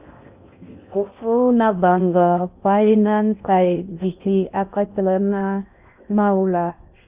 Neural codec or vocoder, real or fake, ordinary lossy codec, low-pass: codec, 16 kHz in and 24 kHz out, 0.6 kbps, FocalCodec, streaming, 4096 codes; fake; Opus, 64 kbps; 3.6 kHz